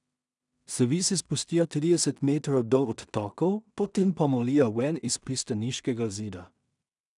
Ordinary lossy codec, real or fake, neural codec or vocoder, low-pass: none; fake; codec, 16 kHz in and 24 kHz out, 0.4 kbps, LongCat-Audio-Codec, two codebook decoder; 10.8 kHz